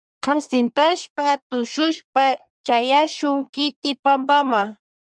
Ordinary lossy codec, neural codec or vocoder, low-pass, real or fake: MP3, 96 kbps; codec, 24 kHz, 1 kbps, SNAC; 9.9 kHz; fake